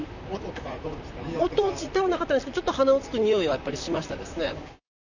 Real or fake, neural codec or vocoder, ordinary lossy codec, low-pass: fake; vocoder, 44.1 kHz, 128 mel bands, Pupu-Vocoder; none; 7.2 kHz